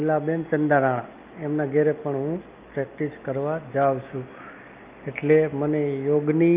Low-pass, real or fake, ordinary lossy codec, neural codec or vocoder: 3.6 kHz; real; Opus, 24 kbps; none